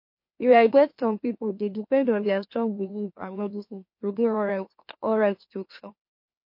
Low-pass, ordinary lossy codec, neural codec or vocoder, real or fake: 5.4 kHz; MP3, 32 kbps; autoencoder, 44.1 kHz, a latent of 192 numbers a frame, MeloTTS; fake